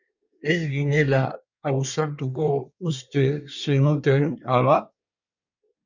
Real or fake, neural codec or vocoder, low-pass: fake; codec, 24 kHz, 1 kbps, SNAC; 7.2 kHz